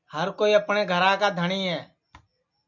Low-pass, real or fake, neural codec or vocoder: 7.2 kHz; real; none